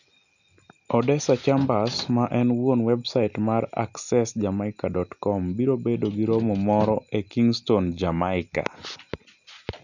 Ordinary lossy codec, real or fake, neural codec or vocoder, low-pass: none; real; none; 7.2 kHz